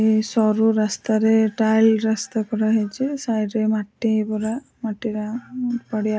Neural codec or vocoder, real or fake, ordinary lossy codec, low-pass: none; real; none; none